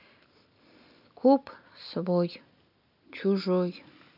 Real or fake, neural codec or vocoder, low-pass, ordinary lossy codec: real; none; 5.4 kHz; none